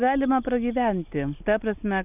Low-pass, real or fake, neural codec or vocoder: 3.6 kHz; real; none